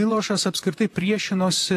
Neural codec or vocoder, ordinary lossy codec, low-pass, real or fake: vocoder, 44.1 kHz, 128 mel bands, Pupu-Vocoder; AAC, 64 kbps; 14.4 kHz; fake